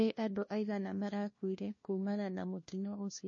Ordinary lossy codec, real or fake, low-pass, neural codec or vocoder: MP3, 32 kbps; fake; 7.2 kHz; codec, 16 kHz, 1 kbps, FunCodec, trained on LibriTTS, 50 frames a second